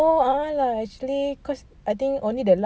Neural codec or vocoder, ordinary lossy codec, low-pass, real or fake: none; none; none; real